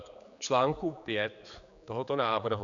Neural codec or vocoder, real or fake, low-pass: codec, 16 kHz, 4 kbps, X-Codec, HuBERT features, trained on general audio; fake; 7.2 kHz